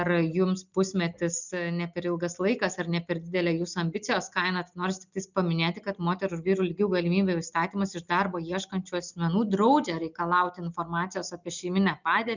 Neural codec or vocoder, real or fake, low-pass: none; real; 7.2 kHz